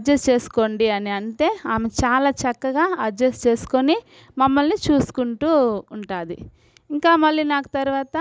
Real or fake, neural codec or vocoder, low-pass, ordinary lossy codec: real; none; none; none